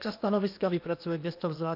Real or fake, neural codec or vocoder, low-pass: fake; codec, 16 kHz in and 24 kHz out, 0.8 kbps, FocalCodec, streaming, 65536 codes; 5.4 kHz